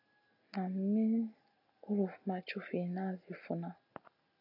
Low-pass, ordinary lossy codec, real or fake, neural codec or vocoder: 5.4 kHz; AAC, 48 kbps; real; none